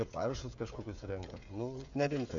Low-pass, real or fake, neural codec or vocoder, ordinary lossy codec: 7.2 kHz; fake; codec, 16 kHz, 16 kbps, FreqCodec, smaller model; AAC, 64 kbps